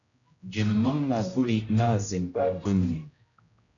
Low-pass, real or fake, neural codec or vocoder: 7.2 kHz; fake; codec, 16 kHz, 0.5 kbps, X-Codec, HuBERT features, trained on general audio